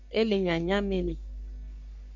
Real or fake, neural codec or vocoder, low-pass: fake; codec, 44.1 kHz, 3.4 kbps, Pupu-Codec; 7.2 kHz